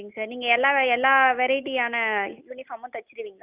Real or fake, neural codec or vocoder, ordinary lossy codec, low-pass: real; none; Opus, 64 kbps; 3.6 kHz